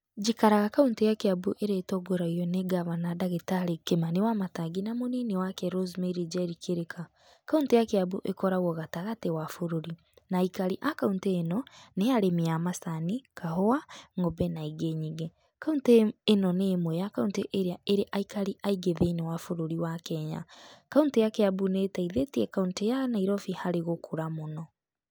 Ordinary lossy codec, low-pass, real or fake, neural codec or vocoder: none; none; real; none